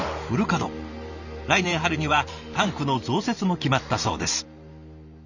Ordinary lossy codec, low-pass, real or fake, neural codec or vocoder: none; 7.2 kHz; fake; vocoder, 44.1 kHz, 128 mel bands every 512 samples, BigVGAN v2